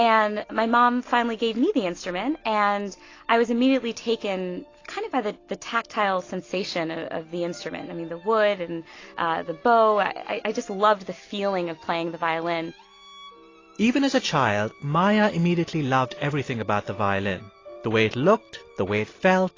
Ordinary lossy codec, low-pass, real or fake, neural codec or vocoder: AAC, 32 kbps; 7.2 kHz; real; none